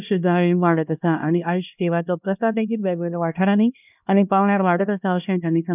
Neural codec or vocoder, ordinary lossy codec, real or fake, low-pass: codec, 16 kHz, 1 kbps, X-Codec, HuBERT features, trained on LibriSpeech; none; fake; 3.6 kHz